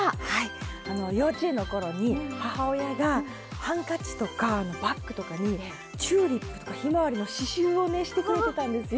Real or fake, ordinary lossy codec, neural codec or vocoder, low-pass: real; none; none; none